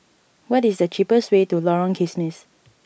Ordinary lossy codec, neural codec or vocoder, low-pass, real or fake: none; none; none; real